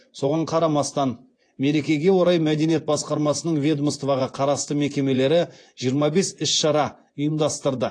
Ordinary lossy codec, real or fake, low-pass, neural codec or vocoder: AAC, 48 kbps; fake; 9.9 kHz; vocoder, 24 kHz, 100 mel bands, Vocos